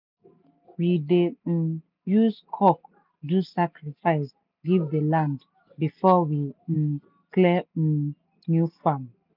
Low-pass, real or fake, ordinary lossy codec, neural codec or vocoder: 5.4 kHz; real; none; none